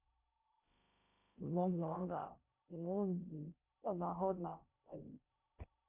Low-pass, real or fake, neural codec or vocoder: 3.6 kHz; fake; codec, 16 kHz in and 24 kHz out, 0.6 kbps, FocalCodec, streaming, 2048 codes